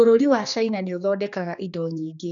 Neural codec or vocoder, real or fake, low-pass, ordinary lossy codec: codec, 16 kHz, 2 kbps, X-Codec, HuBERT features, trained on general audio; fake; 7.2 kHz; none